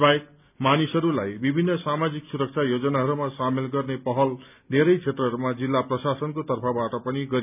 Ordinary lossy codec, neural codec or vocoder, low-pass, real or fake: none; none; 3.6 kHz; real